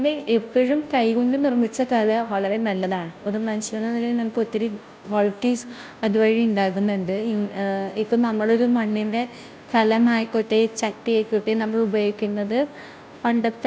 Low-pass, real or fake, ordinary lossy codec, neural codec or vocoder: none; fake; none; codec, 16 kHz, 0.5 kbps, FunCodec, trained on Chinese and English, 25 frames a second